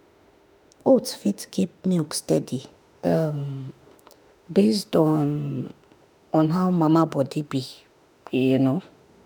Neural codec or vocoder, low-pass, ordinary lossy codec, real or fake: autoencoder, 48 kHz, 32 numbers a frame, DAC-VAE, trained on Japanese speech; none; none; fake